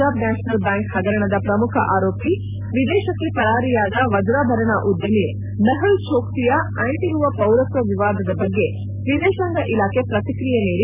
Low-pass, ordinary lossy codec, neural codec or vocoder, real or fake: 3.6 kHz; none; none; real